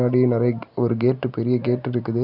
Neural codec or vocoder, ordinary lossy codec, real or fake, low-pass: none; none; real; 5.4 kHz